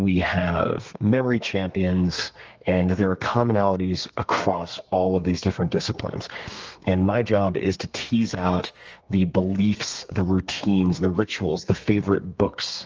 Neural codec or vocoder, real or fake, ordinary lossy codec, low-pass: codec, 32 kHz, 1.9 kbps, SNAC; fake; Opus, 24 kbps; 7.2 kHz